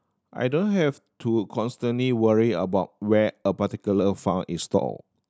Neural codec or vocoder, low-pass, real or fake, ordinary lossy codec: none; none; real; none